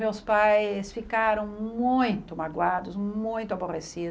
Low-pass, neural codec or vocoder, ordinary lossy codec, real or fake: none; none; none; real